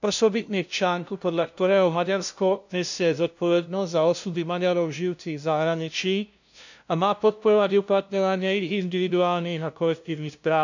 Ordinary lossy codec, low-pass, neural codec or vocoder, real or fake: none; 7.2 kHz; codec, 16 kHz, 0.5 kbps, FunCodec, trained on LibriTTS, 25 frames a second; fake